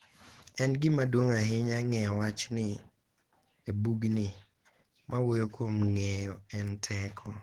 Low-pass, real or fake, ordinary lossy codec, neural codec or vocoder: 19.8 kHz; fake; Opus, 16 kbps; autoencoder, 48 kHz, 128 numbers a frame, DAC-VAE, trained on Japanese speech